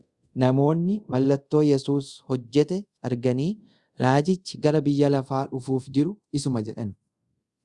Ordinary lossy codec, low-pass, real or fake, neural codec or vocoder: Opus, 64 kbps; 10.8 kHz; fake; codec, 24 kHz, 0.5 kbps, DualCodec